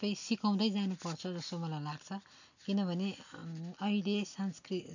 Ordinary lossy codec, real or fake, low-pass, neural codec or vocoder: none; real; 7.2 kHz; none